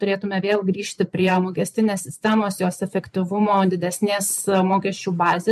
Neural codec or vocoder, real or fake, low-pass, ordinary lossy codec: vocoder, 44.1 kHz, 128 mel bands every 512 samples, BigVGAN v2; fake; 14.4 kHz; MP3, 64 kbps